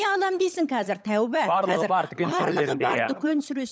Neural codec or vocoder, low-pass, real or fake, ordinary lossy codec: codec, 16 kHz, 16 kbps, FunCodec, trained on LibriTTS, 50 frames a second; none; fake; none